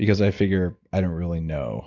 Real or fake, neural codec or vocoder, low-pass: real; none; 7.2 kHz